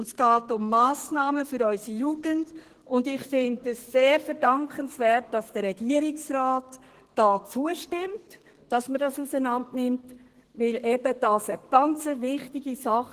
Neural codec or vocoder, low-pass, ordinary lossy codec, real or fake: codec, 32 kHz, 1.9 kbps, SNAC; 14.4 kHz; Opus, 16 kbps; fake